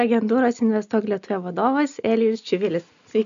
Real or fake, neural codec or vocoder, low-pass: real; none; 7.2 kHz